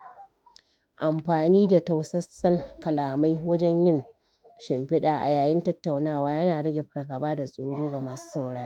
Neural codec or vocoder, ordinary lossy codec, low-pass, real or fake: autoencoder, 48 kHz, 32 numbers a frame, DAC-VAE, trained on Japanese speech; none; 19.8 kHz; fake